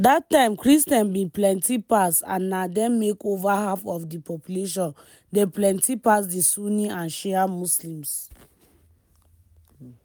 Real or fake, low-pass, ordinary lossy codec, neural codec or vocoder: real; none; none; none